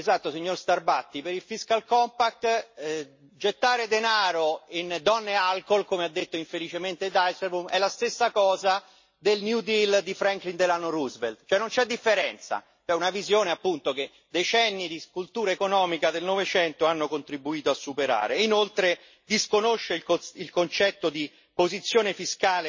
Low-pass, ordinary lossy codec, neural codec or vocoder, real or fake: 7.2 kHz; MP3, 32 kbps; none; real